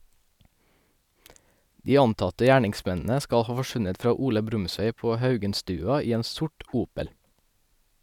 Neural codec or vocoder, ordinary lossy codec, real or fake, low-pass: none; none; real; 19.8 kHz